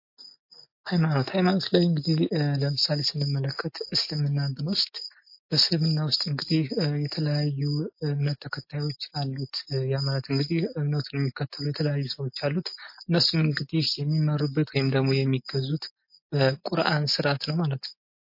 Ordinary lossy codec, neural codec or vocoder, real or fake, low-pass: MP3, 32 kbps; none; real; 5.4 kHz